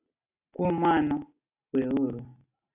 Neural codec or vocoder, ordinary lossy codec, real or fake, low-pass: none; AAC, 32 kbps; real; 3.6 kHz